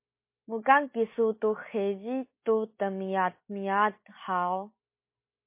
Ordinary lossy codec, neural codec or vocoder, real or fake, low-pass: MP3, 24 kbps; none; real; 3.6 kHz